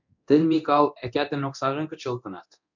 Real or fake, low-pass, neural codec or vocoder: fake; 7.2 kHz; codec, 24 kHz, 0.9 kbps, DualCodec